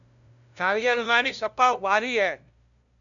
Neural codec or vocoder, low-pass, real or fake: codec, 16 kHz, 0.5 kbps, FunCodec, trained on LibriTTS, 25 frames a second; 7.2 kHz; fake